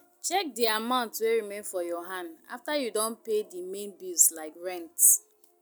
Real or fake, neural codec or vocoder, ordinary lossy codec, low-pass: real; none; none; none